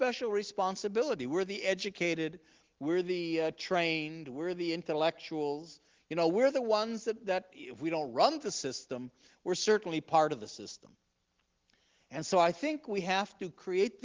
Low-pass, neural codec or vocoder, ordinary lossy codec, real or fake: 7.2 kHz; none; Opus, 16 kbps; real